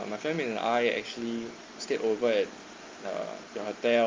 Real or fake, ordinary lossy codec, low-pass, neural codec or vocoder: real; Opus, 32 kbps; 7.2 kHz; none